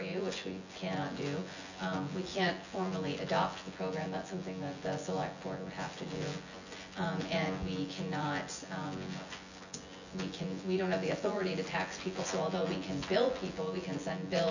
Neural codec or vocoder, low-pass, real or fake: vocoder, 24 kHz, 100 mel bands, Vocos; 7.2 kHz; fake